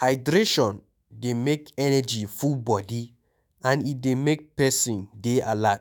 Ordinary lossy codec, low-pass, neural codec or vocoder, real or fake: none; none; autoencoder, 48 kHz, 128 numbers a frame, DAC-VAE, trained on Japanese speech; fake